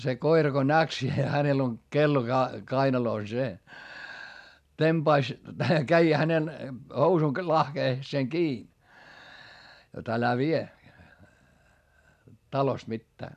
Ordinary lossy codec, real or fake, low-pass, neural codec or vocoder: none; real; 14.4 kHz; none